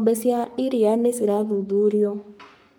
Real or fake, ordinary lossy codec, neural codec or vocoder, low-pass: fake; none; codec, 44.1 kHz, 3.4 kbps, Pupu-Codec; none